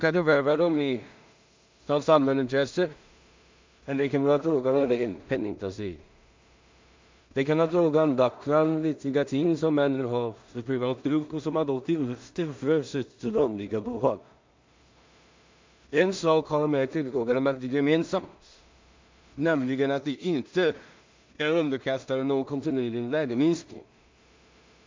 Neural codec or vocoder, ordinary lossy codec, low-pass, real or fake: codec, 16 kHz in and 24 kHz out, 0.4 kbps, LongCat-Audio-Codec, two codebook decoder; MP3, 64 kbps; 7.2 kHz; fake